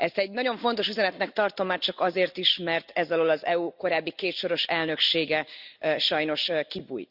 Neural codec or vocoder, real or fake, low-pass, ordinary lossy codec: none; real; 5.4 kHz; Opus, 64 kbps